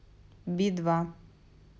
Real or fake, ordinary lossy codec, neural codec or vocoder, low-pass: real; none; none; none